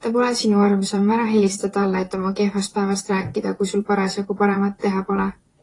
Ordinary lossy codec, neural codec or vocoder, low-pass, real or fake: AAC, 32 kbps; vocoder, 44.1 kHz, 128 mel bands, Pupu-Vocoder; 10.8 kHz; fake